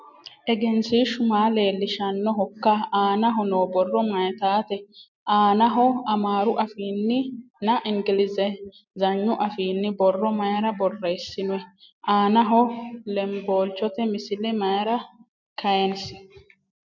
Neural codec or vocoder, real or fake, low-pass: none; real; 7.2 kHz